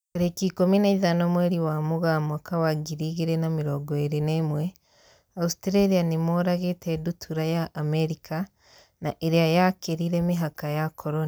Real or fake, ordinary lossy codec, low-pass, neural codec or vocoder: real; none; none; none